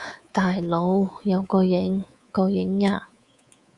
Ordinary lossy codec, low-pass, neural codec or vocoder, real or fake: Opus, 64 kbps; 10.8 kHz; codec, 24 kHz, 3.1 kbps, DualCodec; fake